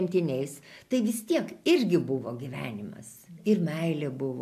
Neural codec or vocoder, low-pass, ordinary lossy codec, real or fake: none; 14.4 kHz; MP3, 96 kbps; real